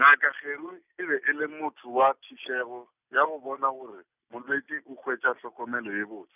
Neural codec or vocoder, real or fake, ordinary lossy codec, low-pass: none; real; none; 3.6 kHz